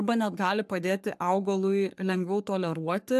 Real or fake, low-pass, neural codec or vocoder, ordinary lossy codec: fake; 14.4 kHz; codec, 44.1 kHz, 3.4 kbps, Pupu-Codec; AAC, 96 kbps